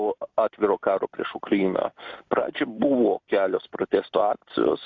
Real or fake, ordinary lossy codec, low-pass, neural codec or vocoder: real; AAC, 48 kbps; 7.2 kHz; none